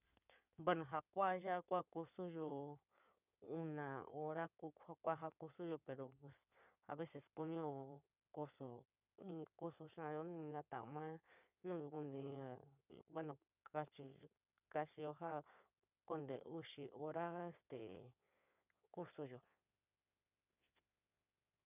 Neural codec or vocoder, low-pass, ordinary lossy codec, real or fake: codec, 16 kHz in and 24 kHz out, 2.2 kbps, FireRedTTS-2 codec; 3.6 kHz; none; fake